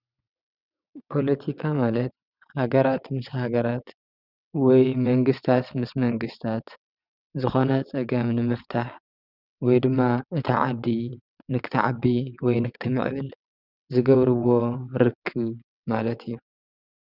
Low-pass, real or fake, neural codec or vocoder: 5.4 kHz; fake; vocoder, 22.05 kHz, 80 mel bands, WaveNeXt